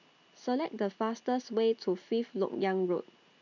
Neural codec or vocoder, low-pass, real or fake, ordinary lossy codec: none; 7.2 kHz; real; none